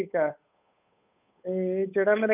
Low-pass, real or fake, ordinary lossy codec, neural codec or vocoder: 3.6 kHz; fake; none; codec, 16 kHz, 8 kbps, FunCodec, trained on Chinese and English, 25 frames a second